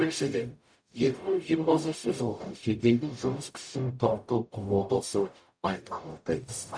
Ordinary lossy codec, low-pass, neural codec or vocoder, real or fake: none; 9.9 kHz; codec, 44.1 kHz, 0.9 kbps, DAC; fake